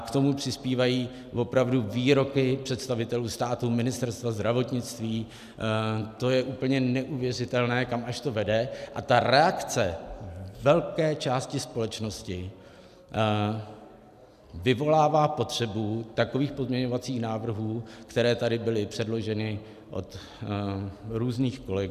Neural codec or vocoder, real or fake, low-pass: none; real; 14.4 kHz